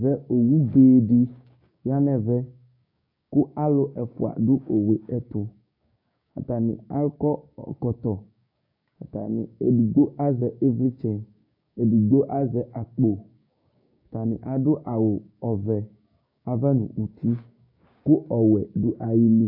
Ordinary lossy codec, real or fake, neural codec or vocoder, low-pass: AAC, 48 kbps; fake; codec, 16 kHz, 6 kbps, DAC; 5.4 kHz